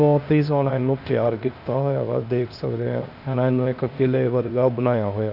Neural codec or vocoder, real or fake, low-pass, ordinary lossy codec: codec, 16 kHz, 0.8 kbps, ZipCodec; fake; 5.4 kHz; none